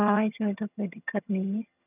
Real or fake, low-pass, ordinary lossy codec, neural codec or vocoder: fake; 3.6 kHz; none; vocoder, 22.05 kHz, 80 mel bands, HiFi-GAN